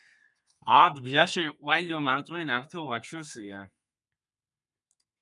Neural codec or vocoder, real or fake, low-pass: codec, 32 kHz, 1.9 kbps, SNAC; fake; 10.8 kHz